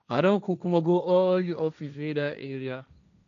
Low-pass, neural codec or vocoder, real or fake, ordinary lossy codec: 7.2 kHz; codec, 16 kHz, 1.1 kbps, Voila-Tokenizer; fake; none